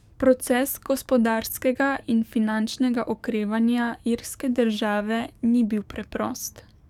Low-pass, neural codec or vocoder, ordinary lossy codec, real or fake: 19.8 kHz; codec, 44.1 kHz, 7.8 kbps, DAC; none; fake